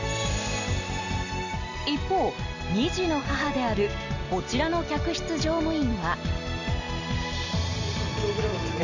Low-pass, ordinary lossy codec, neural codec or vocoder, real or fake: 7.2 kHz; none; none; real